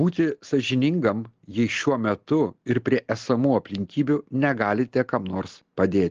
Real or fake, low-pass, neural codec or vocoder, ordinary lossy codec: real; 7.2 kHz; none; Opus, 16 kbps